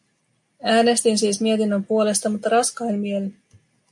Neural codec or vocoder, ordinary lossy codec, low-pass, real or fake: none; MP3, 64 kbps; 10.8 kHz; real